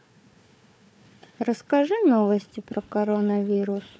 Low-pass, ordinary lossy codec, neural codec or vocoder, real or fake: none; none; codec, 16 kHz, 4 kbps, FunCodec, trained on Chinese and English, 50 frames a second; fake